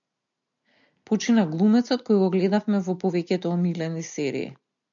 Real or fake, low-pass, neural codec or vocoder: real; 7.2 kHz; none